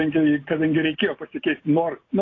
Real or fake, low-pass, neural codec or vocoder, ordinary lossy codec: real; 7.2 kHz; none; AAC, 32 kbps